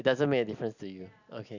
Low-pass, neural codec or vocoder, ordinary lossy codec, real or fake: 7.2 kHz; none; none; real